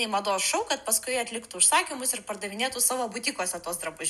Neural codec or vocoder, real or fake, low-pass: none; real; 14.4 kHz